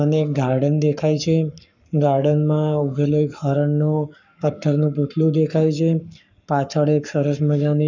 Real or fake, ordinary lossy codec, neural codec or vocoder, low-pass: fake; none; codec, 44.1 kHz, 7.8 kbps, Pupu-Codec; 7.2 kHz